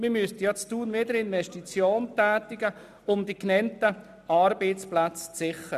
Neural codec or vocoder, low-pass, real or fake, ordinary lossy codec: none; 14.4 kHz; real; none